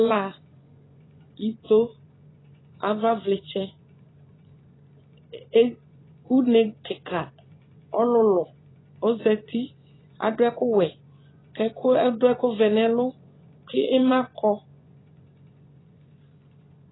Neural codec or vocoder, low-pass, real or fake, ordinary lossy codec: vocoder, 24 kHz, 100 mel bands, Vocos; 7.2 kHz; fake; AAC, 16 kbps